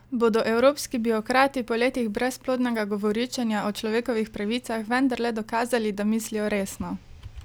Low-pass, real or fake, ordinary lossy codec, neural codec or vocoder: none; real; none; none